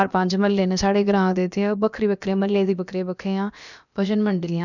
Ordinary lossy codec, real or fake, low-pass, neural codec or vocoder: none; fake; 7.2 kHz; codec, 16 kHz, about 1 kbps, DyCAST, with the encoder's durations